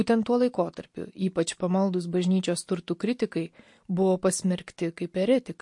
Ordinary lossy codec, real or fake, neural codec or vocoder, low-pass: MP3, 48 kbps; real; none; 10.8 kHz